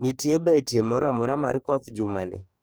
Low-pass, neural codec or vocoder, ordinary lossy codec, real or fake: none; codec, 44.1 kHz, 2.6 kbps, DAC; none; fake